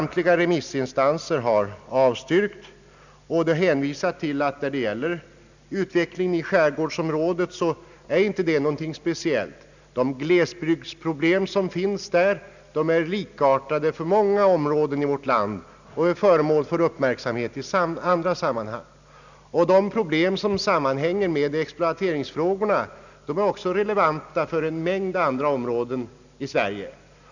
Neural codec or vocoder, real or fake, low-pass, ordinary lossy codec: none; real; 7.2 kHz; none